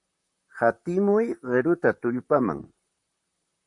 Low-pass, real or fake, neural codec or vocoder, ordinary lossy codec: 10.8 kHz; fake; vocoder, 44.1 kHz, 128 mel bands, Pupu-Vocoder; MP3, 64 kbps